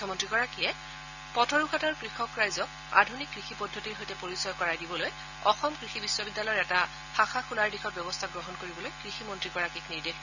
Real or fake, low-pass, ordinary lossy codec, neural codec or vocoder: real; 7.2 kHz; none; none